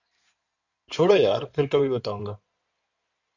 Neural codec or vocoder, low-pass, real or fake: codec, 16 kHz in and 24 kHz out, 2.2 kbps, FireRedTTS-2 codec; 7.2 kHz; fake